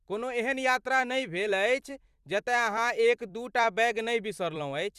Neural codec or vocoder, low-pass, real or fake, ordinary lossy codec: vocoder, 44.1 kHz, 128 mel bands every 256 samples, BigVGAN v2; 14.4 kHz; fake; Opus, 64 kbps